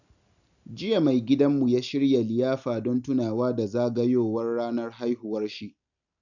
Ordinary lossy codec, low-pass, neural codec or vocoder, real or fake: none; 7.2 kHz; none; real